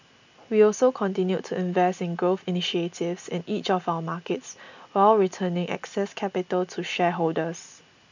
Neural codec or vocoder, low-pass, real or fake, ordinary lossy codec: none; 7.2 kHz; real; none